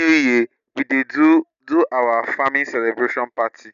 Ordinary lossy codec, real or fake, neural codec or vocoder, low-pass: none; real; none; 7.2 kHz